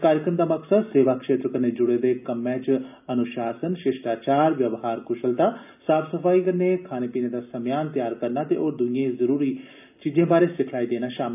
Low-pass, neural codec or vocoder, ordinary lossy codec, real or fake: 3.6 kHz; none; none; real